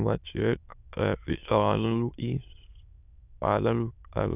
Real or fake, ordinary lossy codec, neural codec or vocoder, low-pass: fake; none; autoencoder, 22.05 kHz, a latent of 192 numbers a frame, VITS, trained on many speakers; 3.6 kHz